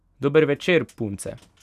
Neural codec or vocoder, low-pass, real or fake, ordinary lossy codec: none; 14.4 kHz; real; none